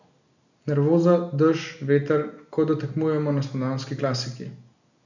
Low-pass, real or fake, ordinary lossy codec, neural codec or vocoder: 7.2 kHz; real; none; none